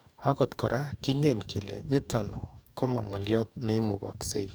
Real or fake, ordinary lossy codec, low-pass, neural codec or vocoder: fake; none; none; codec, 44.1 kHz, 2.6 kbps, DAC